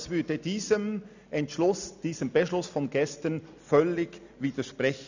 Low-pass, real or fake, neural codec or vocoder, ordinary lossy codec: 7.2 kHz; real; none; none